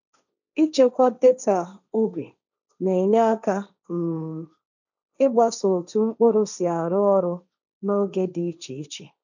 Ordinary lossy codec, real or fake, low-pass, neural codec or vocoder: none; fake; 7.2 kHz; codec, 16 kHz, 1.1 kbps, Voila-Tokenizer